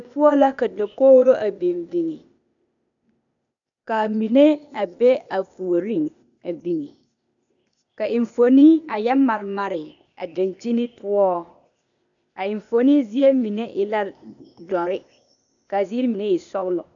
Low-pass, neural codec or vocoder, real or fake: 7.2 kHz; codec, 16 kHz, 0.8 kbps, ZipCodec; fake